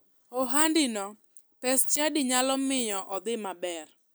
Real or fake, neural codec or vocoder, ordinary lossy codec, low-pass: real; none; none; none